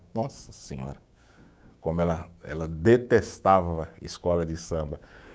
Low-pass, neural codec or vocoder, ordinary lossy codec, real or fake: none; codec, 16 kHz, 6 kbps, DAC; none; fake